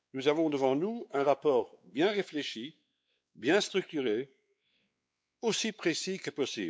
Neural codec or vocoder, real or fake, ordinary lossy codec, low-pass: codec, 16 kHz, 4 kbps, X-Codec, WavLM features, trained on Multilingual LibriSpeech; fake; none; none